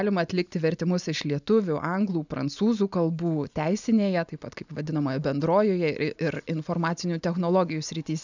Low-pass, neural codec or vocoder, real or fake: 7.2 kHz; none; real